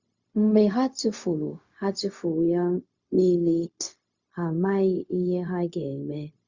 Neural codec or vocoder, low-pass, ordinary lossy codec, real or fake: codec, 16 kHz, 0.4 kbps, LongCat-Audio-Codec; 7.2 kHz; Opus, 64 kbps; fake